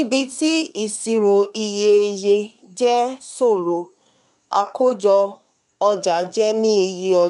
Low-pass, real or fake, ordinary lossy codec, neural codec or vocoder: 10.8 kHz; fake; none; codec, 24 kHz, 1 kbps, SNAC